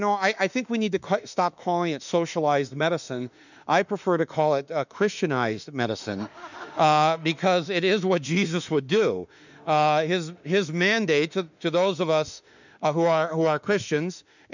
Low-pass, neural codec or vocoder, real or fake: 7.2 kHz; autoencoder, 48 kHz, 32 numbers a frame, DAC-VAE, trained on Japanese speech; fake